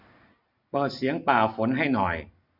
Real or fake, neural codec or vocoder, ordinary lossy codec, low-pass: real; none; none; 5.4 kHz